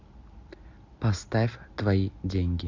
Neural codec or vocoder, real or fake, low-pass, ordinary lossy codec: none; real; 7.2 kHz; MP3, 64 kbps